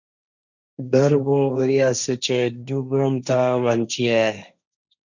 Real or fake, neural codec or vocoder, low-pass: fake; codec, 16 kHz, 1.1 kbps, Voila-Tokenizer; 7.2 kHz